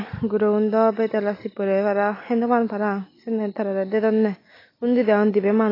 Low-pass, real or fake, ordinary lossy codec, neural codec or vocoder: 5.4 kHz; real; AAC, 24 kbps; none